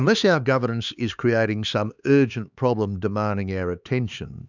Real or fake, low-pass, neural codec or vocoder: fake; 7.2 kHz; codec, 16 kHz, 4 kbps, X-Codec, HuBERT features, trained on LibriSpeech